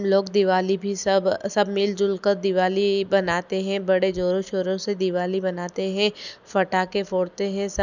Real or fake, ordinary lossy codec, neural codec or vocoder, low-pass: real; none; none; 7.2 kHz